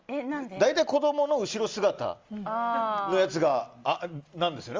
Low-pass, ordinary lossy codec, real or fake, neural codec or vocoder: 7.2 kHz; Opus, 32 kbps; real; none